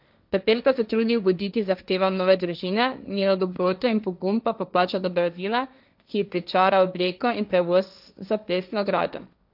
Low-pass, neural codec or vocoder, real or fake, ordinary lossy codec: 5.4 kHz; codec, 16 kHz, 1.1 kbps, Voila-Tokenizer; fake; none